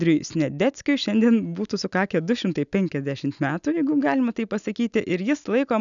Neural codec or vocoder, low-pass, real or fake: none; 7.2 kHz; real